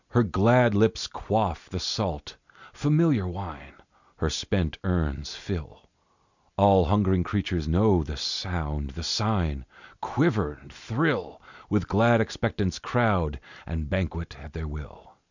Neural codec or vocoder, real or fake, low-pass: none; real; 7.2 kHz